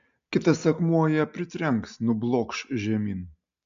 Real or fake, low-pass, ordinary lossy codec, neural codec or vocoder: real; 7.2 kHz; MP3, 64 kbps; none